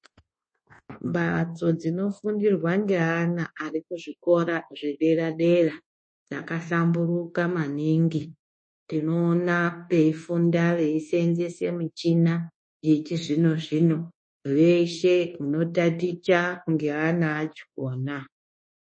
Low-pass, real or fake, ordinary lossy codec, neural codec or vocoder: 9.9 kHz; fake; MP3, 32 kbps; codec, 24 kHz, 1.2 kbps, DualCodec